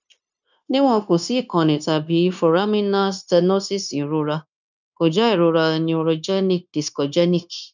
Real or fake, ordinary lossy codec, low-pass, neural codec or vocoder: fake; none; 7.2 kHz; codec, 16 kHz, 0.9 kbps, LongCat-Audio-Codec